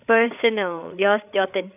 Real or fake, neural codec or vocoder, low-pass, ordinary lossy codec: fake; codec, 16 kHz, 8 kbps, FreqCodec, larger model; 3.6 kHz; none